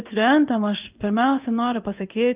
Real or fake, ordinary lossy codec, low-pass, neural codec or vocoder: fake; Opus, 32 kbps; 3.6 kHz; codec, 16 kHz in and 24 kHz out, 1 kbps, XY-Tokenizer